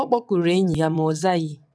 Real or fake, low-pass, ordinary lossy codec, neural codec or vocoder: fake; none; none; vocoder, 22.05 kHz, 80 mel bands, WaveNeXt